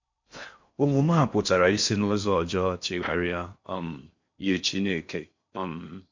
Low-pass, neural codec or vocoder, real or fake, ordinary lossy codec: 7.2 kHz; codec, 16 kHz in and 24 kHz out, 0.6 kbps, FocalCodec, streaming, 4096 codes; fake; MP3, 48 kbps